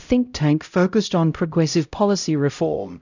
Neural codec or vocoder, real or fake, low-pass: codec, 16 kHz, 0.5 kbps, X-Codec, HuBERT features, trained on LibriSpeech; fake; 7.2 kHz